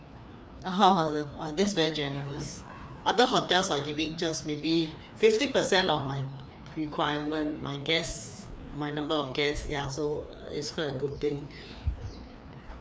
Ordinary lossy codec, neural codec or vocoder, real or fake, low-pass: none; codec, 16 kHz, 2 kbps, FreqCodec, larger model; fake; none